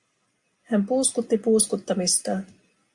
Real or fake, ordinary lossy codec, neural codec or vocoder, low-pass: real; Opus, 64 kbps; none; 10.8 kHz